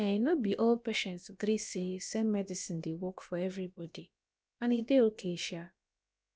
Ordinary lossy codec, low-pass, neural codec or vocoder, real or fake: none; none; codec, 16 kHz, about 1 kbps, DyCAST, with the encoder's durations; fake